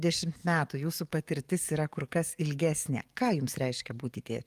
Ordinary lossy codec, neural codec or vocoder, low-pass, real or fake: Opus, 32 kbps; codec, 44.1 kHz, 7.8 kbps, DAC; 14.4 kHz; fake